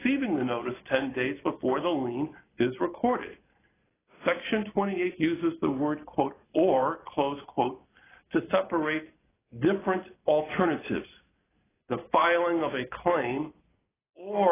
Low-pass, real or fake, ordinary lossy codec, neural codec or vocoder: 3.6 kHz; real; AAC, 16 kbps; none